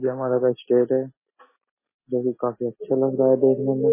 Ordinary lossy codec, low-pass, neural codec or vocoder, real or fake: MP3, 16 kbps; 3.6 kHz; none; real